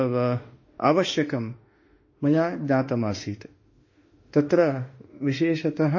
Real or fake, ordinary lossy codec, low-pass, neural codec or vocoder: fake; MP3, 32 kbps; 7.2 kHz; autoencoder, 48 kHz, 32 numbers a frame, DAC-VAE, trained on Japanese speech